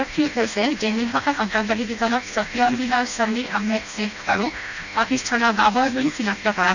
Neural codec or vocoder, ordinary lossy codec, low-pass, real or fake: codec, 16 kHz, 1 kbps, FreqCodec, smaller model; none; 7.2 kHz; fake